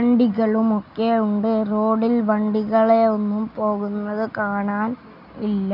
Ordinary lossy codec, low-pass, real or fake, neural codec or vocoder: AAC, 48 kbps; 5.4 kHz; real; none